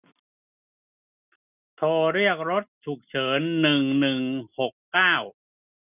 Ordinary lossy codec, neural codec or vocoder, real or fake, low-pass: none; none; real; 3.6 kHz